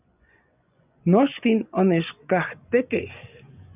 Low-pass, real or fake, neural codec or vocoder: 3.6 kHz; fake; vocoder, 24 kHz, 100 mel bands, Vocos